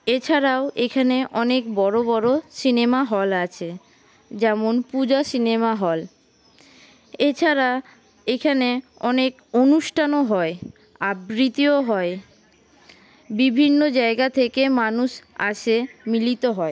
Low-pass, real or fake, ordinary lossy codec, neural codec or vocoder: none; real; none; none